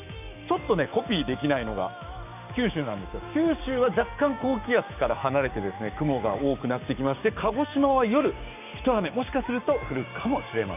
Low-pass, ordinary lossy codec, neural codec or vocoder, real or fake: 3.6 kHz; none; codec, 16 kHz, 6 kbps, DAC; fake